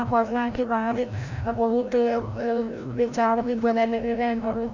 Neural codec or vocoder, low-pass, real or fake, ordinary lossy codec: codec, 16 kHz, 0.5 kbps, FreqCodec, larger model; 7.2 kHz; fake; none